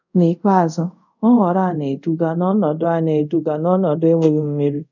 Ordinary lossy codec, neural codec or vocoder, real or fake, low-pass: none; codec, 24 kHz, 0.5 kbps, DualCodec; fake; 7.2 kHz